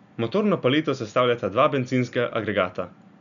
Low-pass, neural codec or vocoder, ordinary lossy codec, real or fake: 7.2 kHz; none; none; real